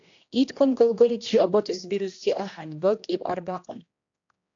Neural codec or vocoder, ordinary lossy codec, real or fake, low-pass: codec, 16 kHz, 1 kbps, X-Codec, HuBERT features, trained on general audio; AAC, 48 kbps; fake; 7.2 kHz